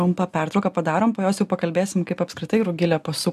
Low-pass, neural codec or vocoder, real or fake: 14.4 kHz; none; real